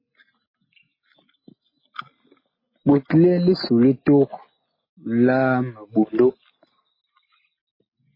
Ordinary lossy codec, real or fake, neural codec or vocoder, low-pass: MP3, 24 kbps; real; none; 5.4 kHz